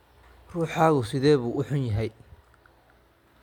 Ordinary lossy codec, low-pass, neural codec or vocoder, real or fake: MP3, 96 kbps; 19.8 kHz; none; real